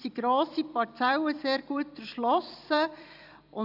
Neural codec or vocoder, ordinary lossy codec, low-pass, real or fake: vocoder, 44.1 kHz, 128 mel bands every 256 samples, BigVGAN v2; none; 5.4 kHz; fake